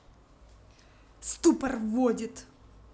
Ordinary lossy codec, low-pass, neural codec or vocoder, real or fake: none; none; none; real